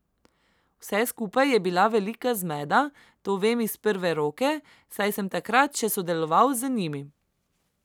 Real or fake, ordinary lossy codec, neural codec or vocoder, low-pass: real; none; none; none